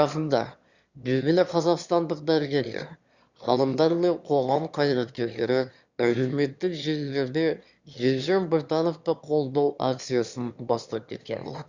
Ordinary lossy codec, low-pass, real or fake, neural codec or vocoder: Opus, 64 kbps; 7.2 kHz; fake; autoencoder, 22.05 kHz, a latent of 192 numbers a frame, VITS, trained on one speaker